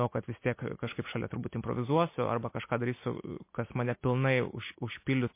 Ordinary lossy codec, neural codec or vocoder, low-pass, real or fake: MP3, 24 kbps; none; 3.6 kHz; real